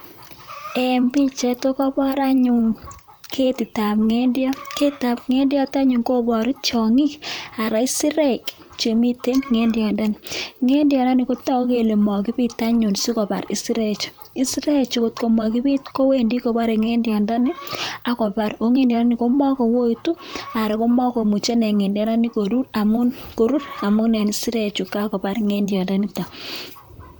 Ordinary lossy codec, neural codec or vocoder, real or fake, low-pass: none; vocoder, 44.1 kHz, 128 mel bands, Pupu-Vocoder; fake; none